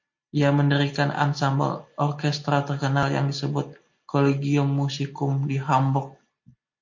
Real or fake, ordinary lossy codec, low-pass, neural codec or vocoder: real; MP3, 48 kbps; 7.2 kHz; none